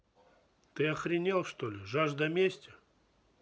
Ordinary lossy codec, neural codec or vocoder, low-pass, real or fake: none; none; none; real